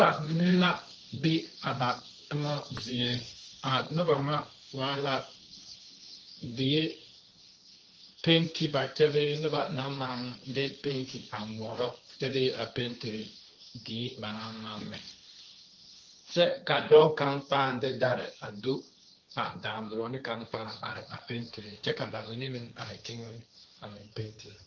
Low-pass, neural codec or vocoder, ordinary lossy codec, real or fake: 7.2 kHz; codec, 16 kHz, 1.1 kbps, Voila-Tokenizer; Opus, 24 kbps; fake